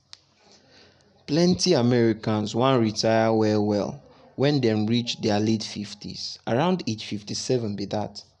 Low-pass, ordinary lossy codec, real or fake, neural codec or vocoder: 10.8 kHz; none; real; none